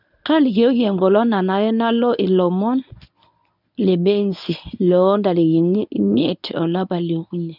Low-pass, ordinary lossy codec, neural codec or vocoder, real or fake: 5.4 kHz; none; codec, 24 kHz, 0.9 kbps, WavTokenizer, medium speech release version 1; fake